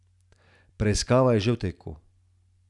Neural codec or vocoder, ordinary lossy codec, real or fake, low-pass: none; none; real; 10.8 kHz